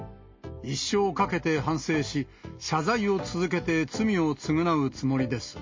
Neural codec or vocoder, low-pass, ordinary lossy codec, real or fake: none; 7.2 kHz; MP3, 32 kbps; real